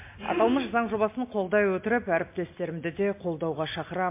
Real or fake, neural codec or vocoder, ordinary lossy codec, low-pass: real; none; MP3, 24 kbps; 3.6 kHz